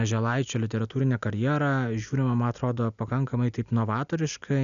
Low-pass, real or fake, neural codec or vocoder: 7.2 kHz; real; none